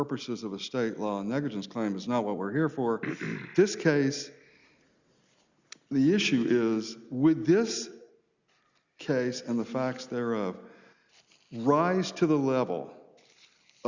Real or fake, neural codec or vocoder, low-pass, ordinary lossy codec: real; none; 7.2 kHz; Opus, 64 kbps